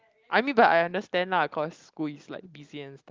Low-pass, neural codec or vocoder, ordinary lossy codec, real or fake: 7.2 kHz; none; Opus, 32 kbps; real